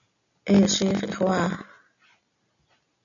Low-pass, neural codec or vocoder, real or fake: 7.2 kHz; none; real